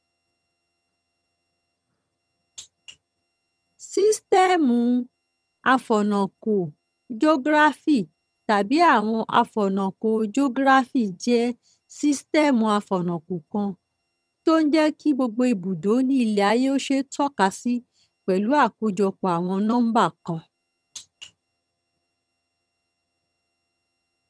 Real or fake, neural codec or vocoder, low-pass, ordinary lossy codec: fake; vocoder, 22.05 kHz, 80 mel bands, HiFi-GAN; none; none